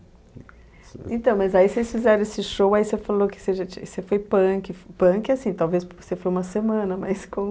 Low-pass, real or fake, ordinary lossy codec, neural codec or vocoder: none; real; none; none